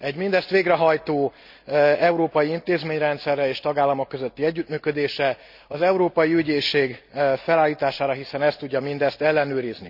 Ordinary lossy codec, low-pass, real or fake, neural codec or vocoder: none; 5.4 kHz; real; none